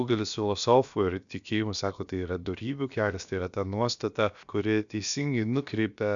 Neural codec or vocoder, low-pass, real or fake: codec, 16 kHz, about 1 kbps, DyCAST, with the encoder's durations; 7.2 kHz; fake